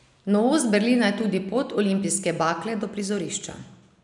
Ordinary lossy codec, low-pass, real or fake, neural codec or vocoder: none; 10.8 kHz; real; none